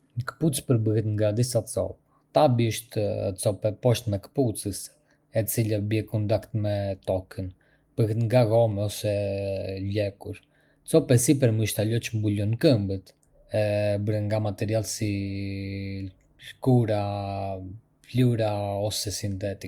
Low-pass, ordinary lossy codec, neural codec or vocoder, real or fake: 19.8 kHz; Opus, 32 kbps; none; real